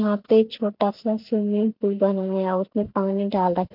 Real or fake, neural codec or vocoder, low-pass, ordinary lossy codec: real; none; 5.4 kHz; MP3, 48 kbps